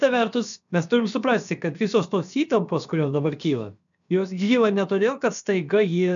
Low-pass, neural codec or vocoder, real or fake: 7.2 kHz; codec, 16 kHz, about 1 kbps, DyCAST, with the encoder's durations; fake